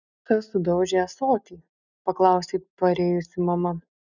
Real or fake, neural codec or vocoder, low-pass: real; none; 7.2 kHz